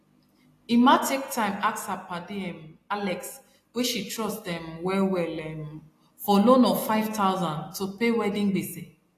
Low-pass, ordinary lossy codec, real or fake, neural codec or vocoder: 14.4 kHz; AAC, 48 kbps; real; none